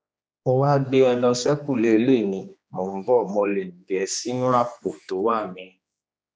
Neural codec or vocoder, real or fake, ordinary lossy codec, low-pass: codec, 16 kHz, 2 kbps, X-Codec, HuBERT features, trained on general audio; fake; none; none